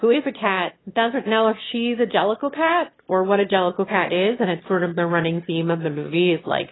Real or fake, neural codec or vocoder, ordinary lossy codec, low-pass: fake; autoencoder, 22.05 kHz, a latent of 192 numbers a frame, VITS, trained on one speaker; AAC, 16 kbps; 7.2 kHz